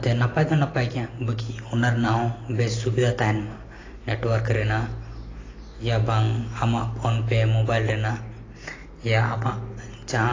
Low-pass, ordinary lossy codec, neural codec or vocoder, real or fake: 7.2 kHz; AAC, 32 kbps; none; real